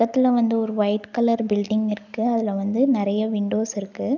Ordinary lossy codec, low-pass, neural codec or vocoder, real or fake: none; 7.2 kHz; none; real